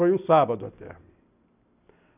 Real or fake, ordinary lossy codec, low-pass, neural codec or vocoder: real; none; 3.6 kHz; none